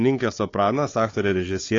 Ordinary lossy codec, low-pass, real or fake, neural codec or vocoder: AAC, 32 kbps; 7.2 kHz; fake; codec, 16 kHz, 4 kbps, FunCodec, trained on Chinese and English, 50 frames a second